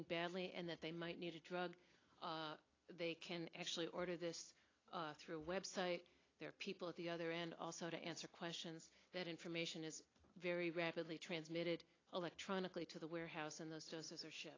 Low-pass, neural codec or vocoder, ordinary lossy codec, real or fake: 7.2 kHz; none; AAC, 32 kbps; real